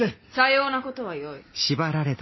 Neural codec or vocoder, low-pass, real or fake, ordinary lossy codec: none; 7.2 kHz; real; MP3, 24 kbps